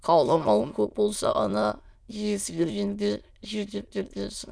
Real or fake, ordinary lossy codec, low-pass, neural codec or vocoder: fake; none; none; autoencoder, 22.05 kHz, a latent of 192 numbers a frame, VITS, trained on many speakers